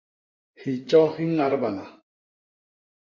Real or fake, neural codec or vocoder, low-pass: fake; codec, 16 kHz in and 24 kHz out, 2.2 kbps, FireRedTTS-2 codec; 7.2 kHz